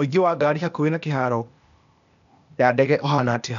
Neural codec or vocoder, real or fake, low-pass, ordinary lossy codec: codec, 16 kHz, 0.8 kbps, ZipCodec; fake; 7.2 kHz; none